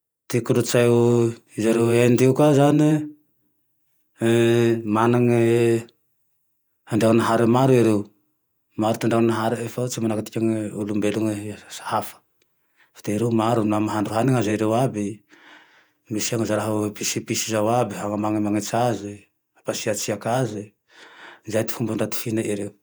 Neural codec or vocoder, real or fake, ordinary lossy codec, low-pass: vocoder, 48 kHz, 128 mel bands, Vocos; fake; none; none